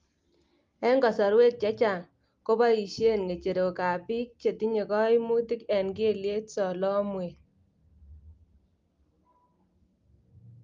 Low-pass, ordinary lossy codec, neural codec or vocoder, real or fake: 7.2 kHz; Opus, 32 kbps; none; real